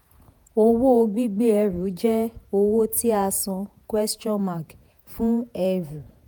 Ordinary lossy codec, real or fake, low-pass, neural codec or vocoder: none; fake; none; vocoder, 48 kHz, 128 mel bands, Vocos